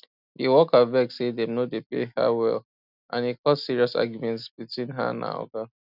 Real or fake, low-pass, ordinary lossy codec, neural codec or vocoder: real; 5.4 kHz; none; none